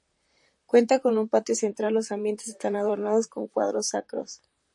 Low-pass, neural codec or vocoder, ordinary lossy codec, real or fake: 10.8 kHz; vocoder, 44.1 kHz, 128 mel bands, Pupu-Vocoder; MP3, 48 kbps; fake